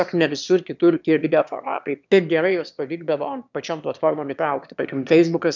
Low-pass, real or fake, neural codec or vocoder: 7.2 kHz; fake; autoencoder, 22.05 kHz, a latent of 192 numbers a frame, VITS, trained on one speaker